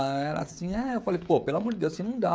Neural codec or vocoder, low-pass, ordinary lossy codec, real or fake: codec, 16 kHz, 16 kbps, FreqCodec, smaller model; none; none; fake